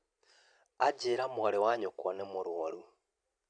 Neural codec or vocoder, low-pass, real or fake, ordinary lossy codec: none; 9.9 kHz; real; none